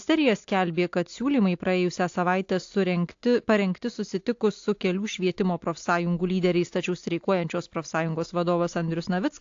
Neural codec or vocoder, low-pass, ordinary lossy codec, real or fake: none; 7.2 kHz; AAC, 48 kbps; real